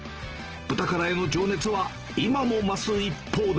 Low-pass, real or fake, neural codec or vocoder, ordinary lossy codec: 7.2 kHz; real; none; Opus, 16 kbps